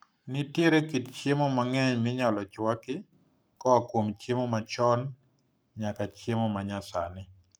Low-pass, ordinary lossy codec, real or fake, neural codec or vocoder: none; none; fake; codec, 44.1 kHz, 7.8 kbps, Pupu-Codec